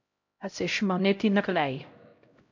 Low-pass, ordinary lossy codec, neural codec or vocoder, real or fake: 7.2 kHz; MP3, 64 kbps; codec, 16 kHz, 0.5 kbps, X-Codec, HuBERT features, trained on LibriSpeech; fake